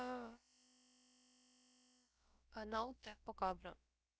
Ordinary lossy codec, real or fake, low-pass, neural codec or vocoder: none; fake; none; codec, 16 kHz, about 1 kbps, DyCAST, with the encoder's durations